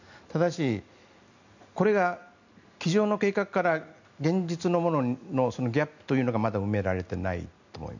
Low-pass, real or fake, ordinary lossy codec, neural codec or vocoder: 7.2 kHz; real; none; none